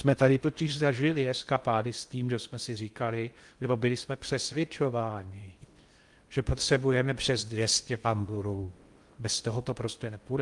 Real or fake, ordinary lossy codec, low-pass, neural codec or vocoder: fake; Opus, 32 kbps; 10.8 kHz; codec, 16 kHz in and 24 kHz out, 0.6 kbps, FocalCodec, streaming, 2048 codes